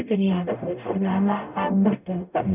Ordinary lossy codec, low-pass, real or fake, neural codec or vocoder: none; 3.6 kHz; fake; codec, 44.1 kHz, 0.9 kbps, DAC